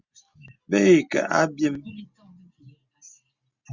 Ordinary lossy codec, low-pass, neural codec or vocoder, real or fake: Opus, 64 kbps; 7.2 kHz; none; real